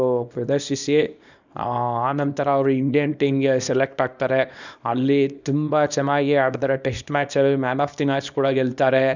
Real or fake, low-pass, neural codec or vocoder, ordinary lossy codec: fake; 7.2 kHz; codec, 24 kHz, 0.9 kbps, WavTokenizer, small release; none